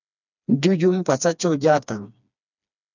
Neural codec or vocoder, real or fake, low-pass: codec, 16 kHz, 2 kbps, FreqCodec, smaller model; fake; 7.2 kHz